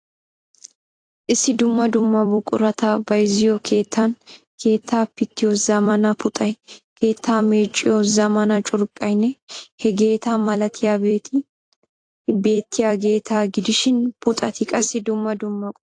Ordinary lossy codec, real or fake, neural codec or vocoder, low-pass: AAC, 48 kbps; fake; vocoder, 44.1 kHz, 128 mel bands every 256 samples, BigVGAN v2; 9.9 kHz